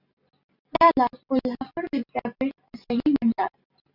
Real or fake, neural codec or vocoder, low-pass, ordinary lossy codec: real; none; 5.4 kHz; Opus, 64 kbps